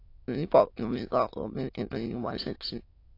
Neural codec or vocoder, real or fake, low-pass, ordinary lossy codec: autoencoder, 22.05 kHz, a latent of 192 numbers a frame, VITS, trained on many speakers; fake; 5.4 kHz; AAC, 32 kbps